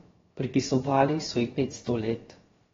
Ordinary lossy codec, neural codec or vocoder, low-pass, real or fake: AAC, 24 kbps; codec, 16 kHz, about 1 kbps, DyCAST, with the encoder's durations; 7.2 kHz; fake